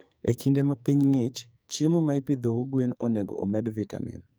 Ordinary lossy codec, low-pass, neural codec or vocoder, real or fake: none; none; codec, 44.1 kHz, 2.6 kbps, SNAC; fake